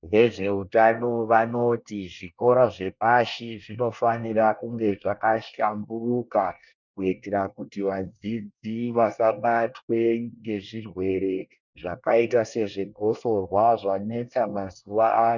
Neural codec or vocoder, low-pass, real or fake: codec, 24 kHz, 1 kbps, SNAC; 7.2 kHz; fake